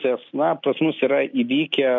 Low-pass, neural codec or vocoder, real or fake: 7.2 kHz; none; real